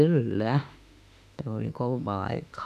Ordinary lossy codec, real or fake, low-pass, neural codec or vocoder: none; fake; 14.4 kHz; autoencoder, 48 kHz, 32 numbers a frame, DAC-VAE, trained on Japanese speech